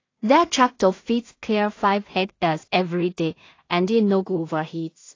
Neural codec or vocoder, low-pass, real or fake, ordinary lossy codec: codec, 16 kHz in and 24 kHz out, 0.4 kbps, LongCat-Audio-Codec, two codebook decoder; 7.2 kHz; fake; AAC, 32 kbps